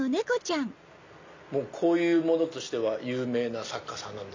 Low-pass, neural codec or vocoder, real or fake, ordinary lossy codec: 7.2 kHz; none; real; MP3, 64 kbps